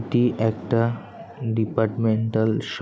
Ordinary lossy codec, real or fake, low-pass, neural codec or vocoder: none; real; none; none